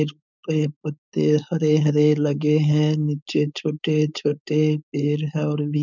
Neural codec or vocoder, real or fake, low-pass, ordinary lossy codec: codec, 16 kHz, 4.8 kbps, FACodec; fake; 7.2 kHz; none